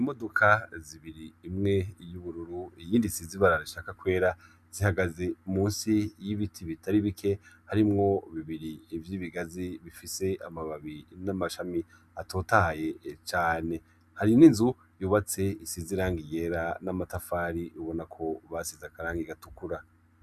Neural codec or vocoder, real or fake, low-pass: none; real; 14.4 kHz